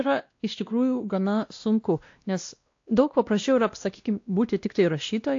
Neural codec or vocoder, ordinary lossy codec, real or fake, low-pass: codec, 16 kHz, 1 kbps, X-Codec, WavLM features, trained on Multilingual LibriSpeech; AAC, 48 kbps; fake; 7.2 kHz